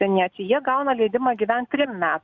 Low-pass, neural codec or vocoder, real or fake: 7.2 kHz; none; real